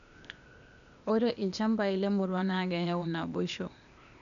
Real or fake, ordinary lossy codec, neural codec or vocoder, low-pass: fake; none; codec, 16 kHz, 0.8 kbps, ZipCodec; 7.2 kHz